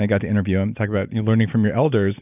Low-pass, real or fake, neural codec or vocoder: 3.6 kHz; real; none